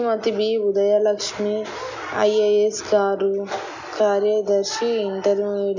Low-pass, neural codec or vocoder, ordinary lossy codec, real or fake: 7.2 kHz; none; none; real